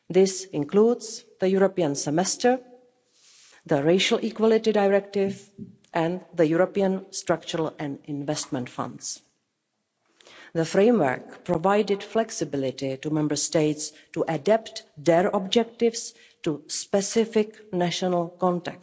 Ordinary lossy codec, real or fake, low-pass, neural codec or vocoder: none; real; none; none